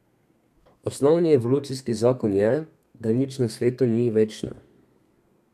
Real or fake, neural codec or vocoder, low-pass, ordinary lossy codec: fake; codec, 32 kHz, 1.9 kbps, SNAC; 14.4 kHz; none